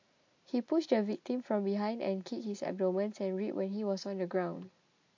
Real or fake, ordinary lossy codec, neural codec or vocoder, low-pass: real; MP3, 48 kbps; none; 7.2 kHz